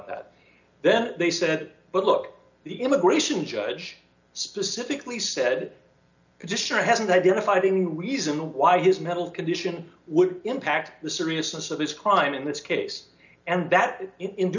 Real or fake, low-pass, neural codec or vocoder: real; 7.2 kHz; none